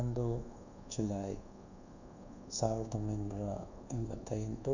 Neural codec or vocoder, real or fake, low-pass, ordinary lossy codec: codec, 24 kHz, 1.2 kbps, DualCodec; fake; 7.2 kHz; none